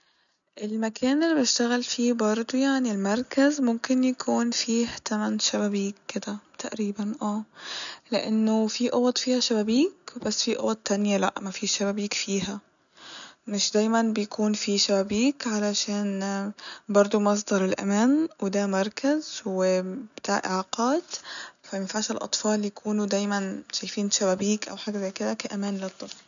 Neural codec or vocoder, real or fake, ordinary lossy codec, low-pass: none; real; none; 7.2 kHz